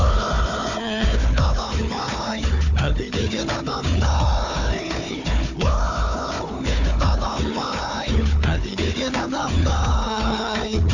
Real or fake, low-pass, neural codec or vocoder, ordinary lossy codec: fake; 7.2 kHz; codec, 16 kHz, 4 kbps, FunCodec, trained on Chinese and English, 50 frames a second; none